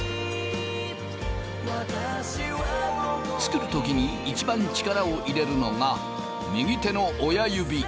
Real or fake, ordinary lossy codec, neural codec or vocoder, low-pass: real; none; none; none